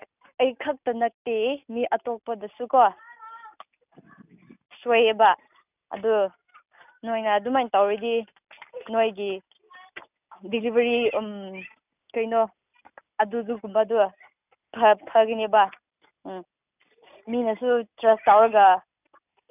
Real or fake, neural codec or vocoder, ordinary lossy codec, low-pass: real; none; none; 3.6 kHz